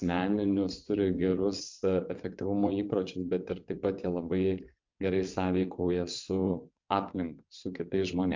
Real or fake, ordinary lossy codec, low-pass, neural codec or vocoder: fake; MP3, 64 kbps; 7.2 kHz; vocoder, 22.05 kHz, 80 mel bands, WaveNeXt